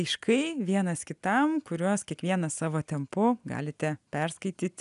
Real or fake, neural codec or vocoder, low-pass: real; none; 10.8 kHz